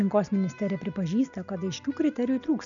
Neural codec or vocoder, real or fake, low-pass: none; real; 7.2 kHz